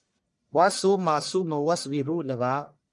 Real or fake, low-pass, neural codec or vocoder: fake; 10.8 kHz; codec, 44.1 kHz, 1.7 kbps, Pupu-Codec